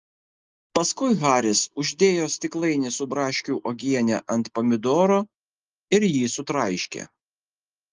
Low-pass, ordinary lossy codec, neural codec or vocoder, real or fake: 7.2 kHz; Opus, 32 kbps; none; real